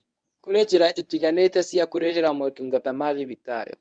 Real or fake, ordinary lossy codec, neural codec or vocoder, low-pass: fake; MP3, 96 kbps; codec, 24 kHz, 0.9 kbps, WavTokenizer, medium speech release version 1; 9.9 kHz